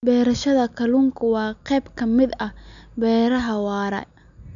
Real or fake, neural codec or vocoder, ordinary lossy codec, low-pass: real; none; none; 7.2 kHz